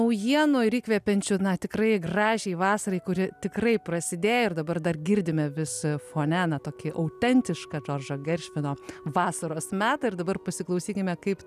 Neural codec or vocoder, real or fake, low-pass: none; real; 14.4 kHz